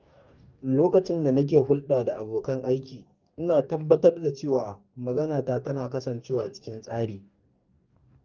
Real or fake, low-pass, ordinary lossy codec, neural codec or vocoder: fake; 7.2 kHz; Opus, 24 kbps; codec, 44.1 kHz, 2.6 kbps, DAC